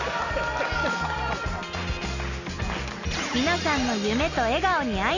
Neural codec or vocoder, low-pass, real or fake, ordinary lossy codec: none; 7.2 kHz; real; none